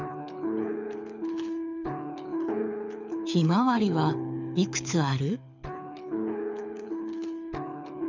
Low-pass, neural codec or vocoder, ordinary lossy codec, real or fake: 7.2 kHz; codec, 24 kHz, 6 kbps, HILCodec; none; fake